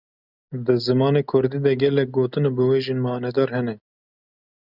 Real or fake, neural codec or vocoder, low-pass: real; none; 5.4 kHz